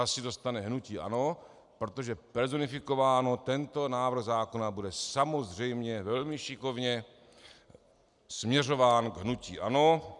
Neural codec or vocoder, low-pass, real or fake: none; 10.8 kHz; real